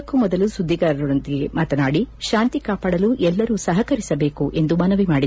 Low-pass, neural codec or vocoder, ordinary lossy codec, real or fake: none; none; none; real